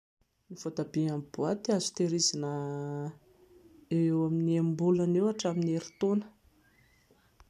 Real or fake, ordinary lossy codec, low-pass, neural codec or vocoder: real; none; 14.4 kHz; none